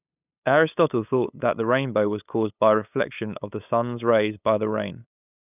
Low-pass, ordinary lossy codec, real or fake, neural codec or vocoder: 3.6 kHz; none; fake; codec, 16 kHz, 8 kbps, FunCodec, trained on LibriTTS, 25 frames a second